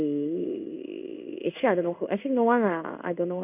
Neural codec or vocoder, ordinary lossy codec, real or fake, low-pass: codec, 16 kHz in and 24 kHz out, 1 kbps, XY-Tokenizer; none; fake; 3.6 kHz